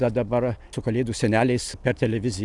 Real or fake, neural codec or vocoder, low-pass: real; none; 10.8 kHz